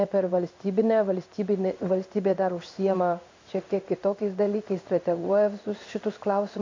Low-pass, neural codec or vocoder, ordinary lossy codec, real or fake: 7.2 kHz; codec, 16 kHz in and 24 kHz out, 1 kbps, XY-Tokenizer; MP3, 64 kbps; fake